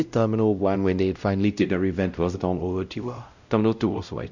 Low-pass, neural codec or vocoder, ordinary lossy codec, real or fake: 7.2 kHz; codec, 16 kHz, 0.5 kbps, X-Codec, WavLM features, trained on Multilingual LibriSpeech; none; fake